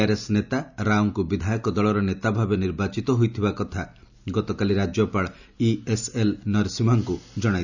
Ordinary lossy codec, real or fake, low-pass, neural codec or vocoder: none; real; 7.2 kHz; none